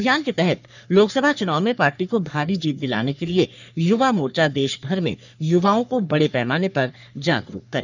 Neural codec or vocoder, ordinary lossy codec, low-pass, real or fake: codec, 44.1 kHz, 3.4 kbps, Pupu-Codec; none; 7.2 kHz; fake